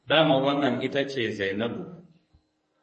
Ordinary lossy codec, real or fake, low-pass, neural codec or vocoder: MP3, 32 kbps; fake; 10.8 kHz; codec, 44.1 kHz, 2.6 kbps, SNAC